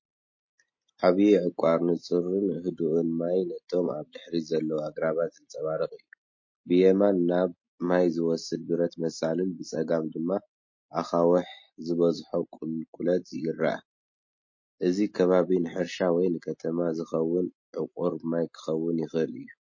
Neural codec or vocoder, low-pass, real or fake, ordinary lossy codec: none; 7.2 kHz; real; MP3, 32 kbps